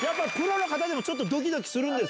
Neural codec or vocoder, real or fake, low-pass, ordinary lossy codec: none; real; none; none